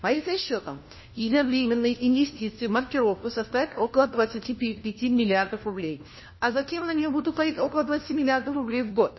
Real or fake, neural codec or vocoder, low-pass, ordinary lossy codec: fake; codec, 16 kHz, 1 kbps, FunCodec, trained on LibriTTS, 50 frames a second; 7.2 kHz; MP3, 24 kbps